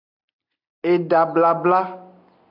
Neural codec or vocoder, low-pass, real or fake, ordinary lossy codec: none; 5.4 kHz; real; AAC, 48 kbps